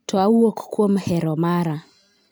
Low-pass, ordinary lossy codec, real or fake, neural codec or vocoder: none; none; real; none